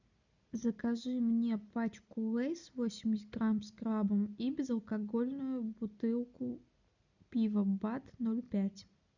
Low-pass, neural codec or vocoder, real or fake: 7.2 kHz; none; real